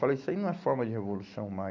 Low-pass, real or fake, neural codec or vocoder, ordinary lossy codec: 7.2 kHz; real; none; MP3, 64 kbps